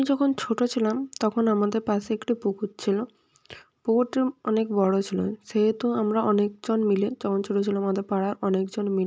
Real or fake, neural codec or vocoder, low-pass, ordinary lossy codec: real; none; none; none